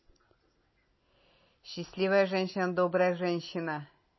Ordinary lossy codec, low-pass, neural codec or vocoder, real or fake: MP3, 24 kbps; 7.2 kHz; none; real